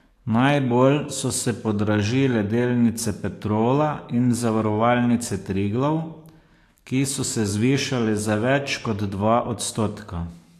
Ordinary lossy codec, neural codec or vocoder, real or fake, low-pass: AAC, 64 kbps; codec, 44.1 kHz, 7.8 kbps, DAC; fake; 14.4 kHz